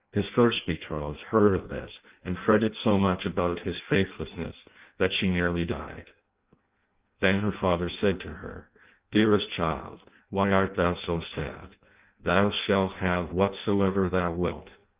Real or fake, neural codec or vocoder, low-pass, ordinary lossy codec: fake; codec, 16 kHz in and 24 kHz out, 0.6 kbps, FireRedTTS-2 codec; 3.6 kHz; Opus, 32 kbps